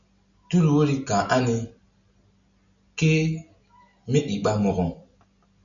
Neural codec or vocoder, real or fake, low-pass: none; real; 7.2 kHz